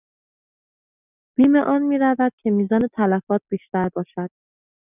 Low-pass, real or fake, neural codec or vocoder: 3.6 kHz; real; none